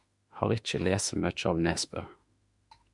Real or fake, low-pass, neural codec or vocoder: fake; 10.8 kHz; autoencoder, 48 kHz, 32 numbers a frame, DAC-VAE, trained on Japanese speech